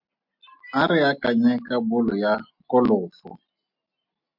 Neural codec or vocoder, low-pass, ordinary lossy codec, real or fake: none; 5.4 kHz; MP3, 48 kbps; real